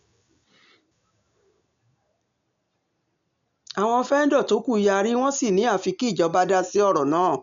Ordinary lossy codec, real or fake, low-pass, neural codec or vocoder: MP3, 64 kbps; real; 7.2 kHz; none